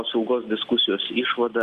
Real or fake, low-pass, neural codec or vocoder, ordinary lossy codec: real; 14.4 kHz; none; Opus, 24 kbps